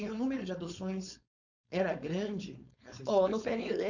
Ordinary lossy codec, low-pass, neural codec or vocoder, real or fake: none; 7.2 kHz; codec, 16 kHz, 4.8 kbps, FACodec; fake